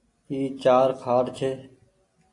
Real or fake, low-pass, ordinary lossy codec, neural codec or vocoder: real; 10.8 kHz; AAC, 48 kbps; none